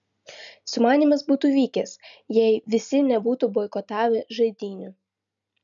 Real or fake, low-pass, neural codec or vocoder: real; 7.2 kHz; none